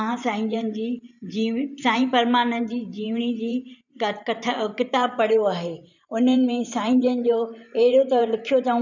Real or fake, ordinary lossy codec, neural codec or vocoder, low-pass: fake; none; vocoder, 44.1 kHz, 128 mel bands every 512 samples, BigVGAN v2; 7.2 kHz